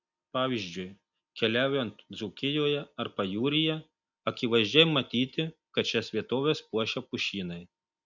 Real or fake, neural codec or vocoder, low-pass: real; none; 7.2 kHz